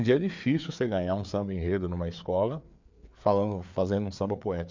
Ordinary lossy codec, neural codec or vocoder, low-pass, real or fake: MP3, 64 kbps; codec, 16 kHz, 4 kbps, FreqCodec, larger model; 7.2 kHz; fake